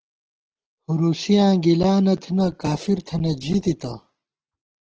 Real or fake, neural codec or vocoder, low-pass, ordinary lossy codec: real; none; 7.2 kHz; Opus, 16 kbps